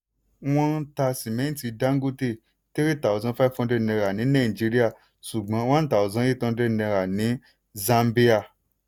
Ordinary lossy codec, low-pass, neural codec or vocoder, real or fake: none; none; none; real